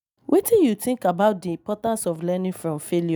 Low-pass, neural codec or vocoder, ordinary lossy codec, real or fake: none; none; none; real